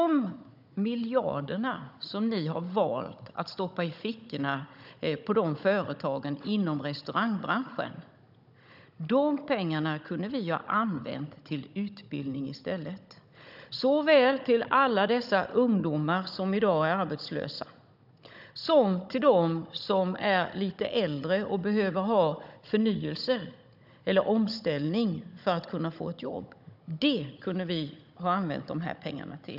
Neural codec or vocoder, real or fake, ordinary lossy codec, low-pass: codec, 16 kHz, 16 kbps, FunCodec, trained on Chinese and English, 50 frames a second; fake; none; 5.4 kHz